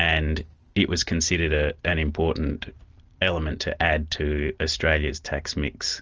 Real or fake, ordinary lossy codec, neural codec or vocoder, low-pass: real; Opus, 24 kbps; none; 7.2 kHz